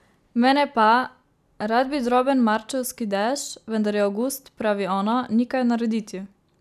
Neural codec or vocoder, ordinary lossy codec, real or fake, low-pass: none; none; real; 14.4 kHz